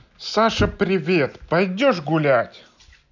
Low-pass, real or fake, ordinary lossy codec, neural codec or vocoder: 7.2 kHz; real; none; none